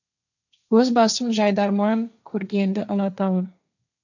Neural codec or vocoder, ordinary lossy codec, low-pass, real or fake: codec, 16 kHz, 1.1 kbps, Voila-Tokenizer; none; 7.2 kHz; fake